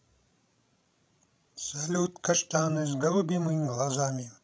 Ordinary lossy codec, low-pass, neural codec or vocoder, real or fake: none; none; codec, 16 kHz, 16 kbps, FreqCodec, larger model; fake